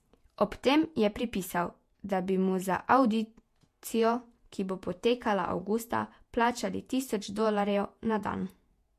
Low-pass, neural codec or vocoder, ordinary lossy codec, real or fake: 14.4 kHz; vocoder, 48 kHz, 128 mel bands, Vocos; MP3, 64 kbps; fake